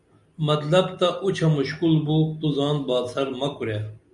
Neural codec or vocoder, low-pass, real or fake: none; 10.8 kHz; real